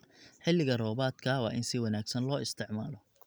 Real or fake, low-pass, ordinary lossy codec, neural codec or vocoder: real; none; none; none